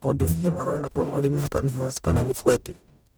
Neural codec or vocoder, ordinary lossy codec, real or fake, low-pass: codec, 44.1 kHz, 0.9 kbps, DAC; none; fake; none